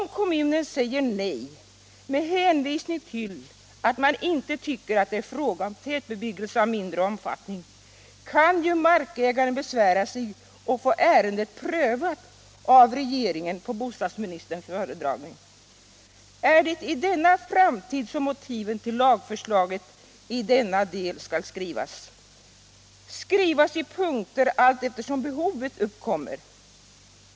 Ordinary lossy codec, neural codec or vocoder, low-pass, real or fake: none; none; none; real